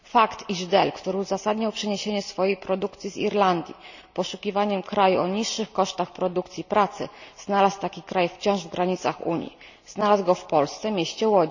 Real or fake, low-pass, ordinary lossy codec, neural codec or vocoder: real; 7.2 kHz; none; none